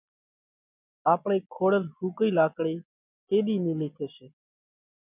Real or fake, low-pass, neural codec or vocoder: real; 3.6 kHz; none